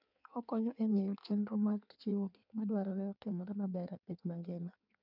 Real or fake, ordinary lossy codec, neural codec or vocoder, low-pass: fake; none; codec, 16 kHz in and 24 kHz out, 1.1 kbps, FireRedTTS-2 codec; 5.4 kHz